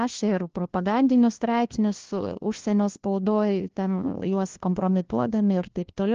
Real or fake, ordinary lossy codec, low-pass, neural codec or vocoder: fake; Opus, 16 kbps; 7.2 kHz; codec, 16 kHz, 1 kbps, FunCodec, trained on LibriTTS, 50 frames a second